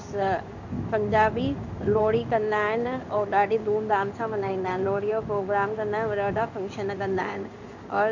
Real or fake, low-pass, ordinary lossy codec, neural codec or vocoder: fake; 7.2 kHz; none; codec, 16 kHz in and 24 kHz out, 1 kbps, XY-Tokenizer